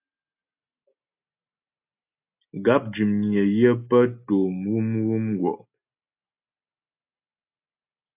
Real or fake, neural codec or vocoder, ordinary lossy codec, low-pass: real; none; AAC, 32 kbps; 3.6 kHz